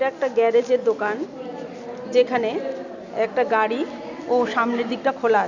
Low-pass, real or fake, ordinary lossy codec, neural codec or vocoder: 7.2 kHz; real; none; none